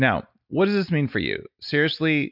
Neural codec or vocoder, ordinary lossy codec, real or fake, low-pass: none; MP3, 48 kbps; real; 5.4 kHz